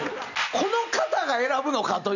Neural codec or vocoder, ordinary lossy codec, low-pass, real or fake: none; none; 7.2 kHz; real